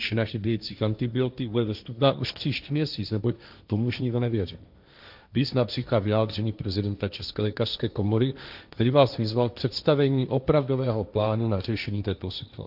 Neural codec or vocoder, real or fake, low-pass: codec, 16 kHz, 1.1 kbps, Voila-Tokenizer; fake; 5.4 kHz